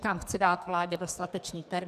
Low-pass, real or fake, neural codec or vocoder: 14.4 kHz; fake; codec, 44.1 kHz, 2.6 kbps, SNAC